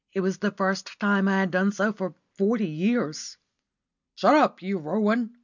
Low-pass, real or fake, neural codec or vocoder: 7.2 kHz; real; none